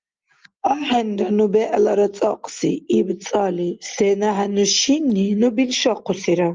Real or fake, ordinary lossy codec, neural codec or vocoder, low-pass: real; Opus, 32 kbps; none; 7.2 kHz